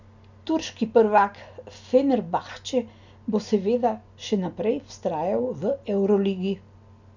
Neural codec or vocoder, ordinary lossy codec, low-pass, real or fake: none; none; 7.2 kHz; real